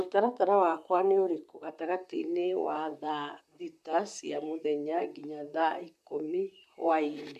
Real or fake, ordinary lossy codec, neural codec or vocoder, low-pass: fake; MP3, 96 kbps; vocoder, 44.1 kHz, 128 mel bands, Pupu-Vocoder; 14.4 kHz